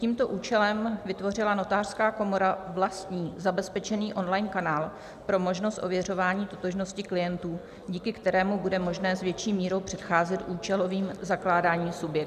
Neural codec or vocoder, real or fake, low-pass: none; real; 14.4 kHz